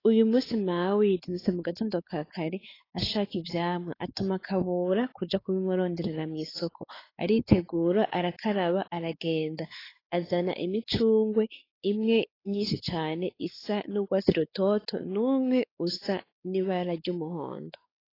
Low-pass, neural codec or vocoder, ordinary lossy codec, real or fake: 5.4 kHz; codec, 16 kHz, 4 kbps, X-Codec, WavLM features, trained on Multilingual LibriSpeech; AAC, 24 kbps; fake